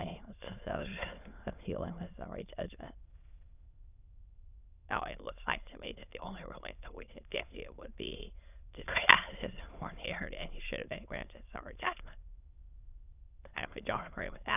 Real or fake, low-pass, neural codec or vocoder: fake; 3.6 kHz; autoencoder, 22.05 kHz, a latent of 192 numbers a frame, VITS, trained on many speakers